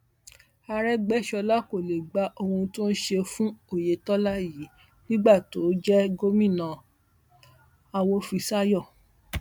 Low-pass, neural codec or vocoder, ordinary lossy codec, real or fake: 19.8 kHz; none; MP3, 96 kbps; real